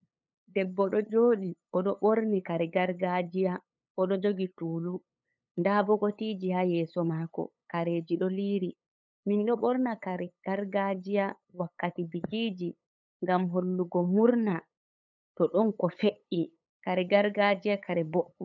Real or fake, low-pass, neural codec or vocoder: fake; 7.2 kHz; codec, 16 kHz, 8 kbps, FunCodec, trained on LibriTTS, 25 frames a second